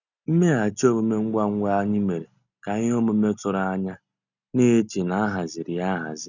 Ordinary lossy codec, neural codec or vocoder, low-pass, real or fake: none; none; 7.2 kHz; real